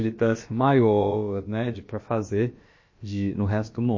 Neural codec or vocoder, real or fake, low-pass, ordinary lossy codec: codec, 16 kHz, about 1 kbps, DyCAST, with the encoder's durations; fake; 7.2 kHz; MP3, 32 kbps